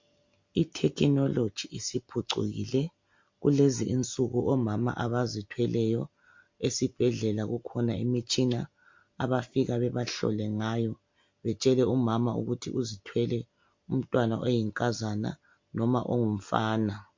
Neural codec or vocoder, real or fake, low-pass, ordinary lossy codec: none; real; 7.2 kHz; MP3, 48 kbps